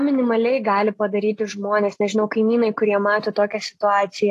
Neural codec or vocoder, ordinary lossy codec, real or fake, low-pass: none; AAC, 48 kbps; real; 14.4 kHz